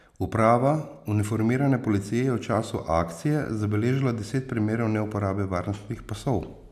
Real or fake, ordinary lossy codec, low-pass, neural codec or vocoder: real; none; 14.4 kHz; none